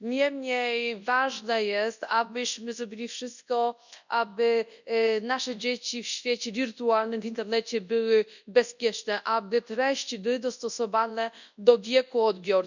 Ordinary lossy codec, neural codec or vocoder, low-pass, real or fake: none; codec, 24 kHz, 0.9 kbps, WavTokenizer, large speech release; 7.2 kHz; fake